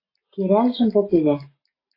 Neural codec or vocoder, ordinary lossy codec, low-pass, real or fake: none; AAC, 24 kbps; 5.4 kHz; real